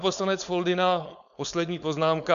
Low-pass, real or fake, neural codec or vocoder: 7.2 kHz; fake; codec, 16 kHz, 4.8 kbps, FACodec